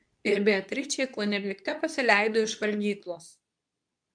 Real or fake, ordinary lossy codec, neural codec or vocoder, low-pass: fake; MP3, 96 kbps; codec, 24 kHz, 0.9 kbps, WavTokenizer, medium speech release version 2; 9.9 kHz